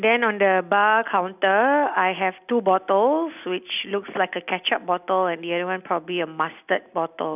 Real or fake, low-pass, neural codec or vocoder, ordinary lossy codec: real; 3.6 kHz; none; none